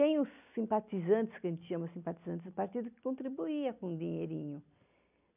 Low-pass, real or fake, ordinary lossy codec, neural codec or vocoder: 3.6 kHz; real; none; none